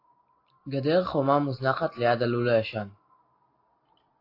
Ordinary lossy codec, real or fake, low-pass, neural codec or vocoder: AAC, 32 kbps; real; 5.4 kHz; none